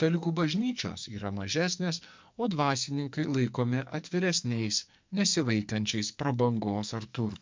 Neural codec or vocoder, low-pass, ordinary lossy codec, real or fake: codec, 44.1 kHz, 2.6 kbps, SNAC; 7.2 kHz; MP3, 64 kbps; fake